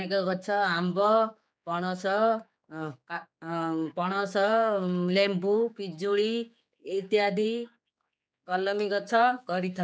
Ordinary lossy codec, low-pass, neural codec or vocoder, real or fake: none; none; codec, 16 kHz, 4 kbps, X-Codec, HuBERT features, trained on general audio; fake